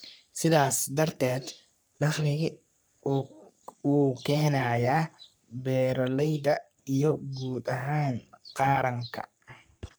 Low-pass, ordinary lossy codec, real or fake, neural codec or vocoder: none; none; fake; codec, 44.1 kHz, 3.4 kbps, Pupu-Codec